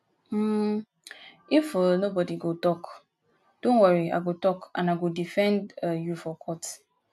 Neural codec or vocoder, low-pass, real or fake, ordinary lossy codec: none; 14.4 kHz; real; none